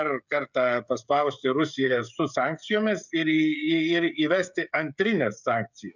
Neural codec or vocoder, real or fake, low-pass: codec, 16 kHz, 16 kbps, FreqCodec, smaller model; fake; 7.2 kHz